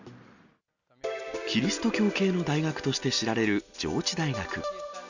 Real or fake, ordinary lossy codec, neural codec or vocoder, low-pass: real; none; none; 7.2 kHz